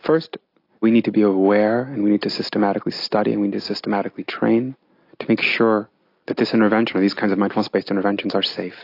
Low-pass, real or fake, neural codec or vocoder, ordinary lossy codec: 5.4 kHz; real; none; AAC, 32 kbps